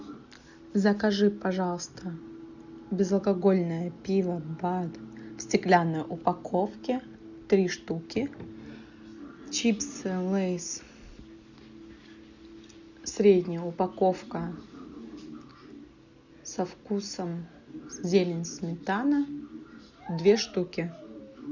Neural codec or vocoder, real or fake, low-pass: none; real; 7.2 kHz